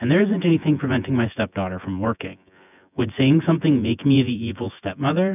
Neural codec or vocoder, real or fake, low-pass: vocoder, 24 kHz, 100 mel bands, Vocos; fake; 3.6 kHz